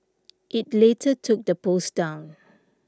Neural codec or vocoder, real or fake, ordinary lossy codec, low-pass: none; real; none; none